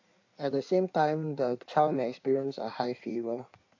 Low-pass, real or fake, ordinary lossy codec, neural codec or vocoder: 7.2 kHz; fake; AAC, 48 kbps; codec, 16 kHz in and 24 kHz out, 1.1 kbps, FireRedTTS-2 codec